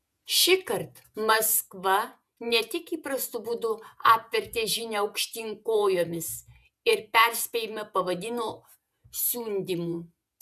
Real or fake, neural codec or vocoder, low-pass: fake; vocoder, 48 kHz, 128 mel bands, Vocos; 14.4 kHz